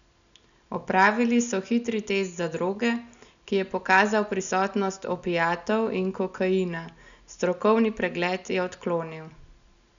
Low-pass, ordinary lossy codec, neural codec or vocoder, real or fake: 7.2 kHz; none; none; real